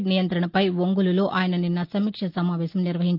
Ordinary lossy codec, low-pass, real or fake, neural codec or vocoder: Opus, 24 kbps; 5.4 kHz; real; none